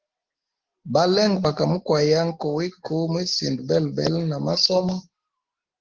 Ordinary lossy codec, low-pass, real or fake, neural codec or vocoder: Opus, 16 kbps; 7.2 kHz; real; none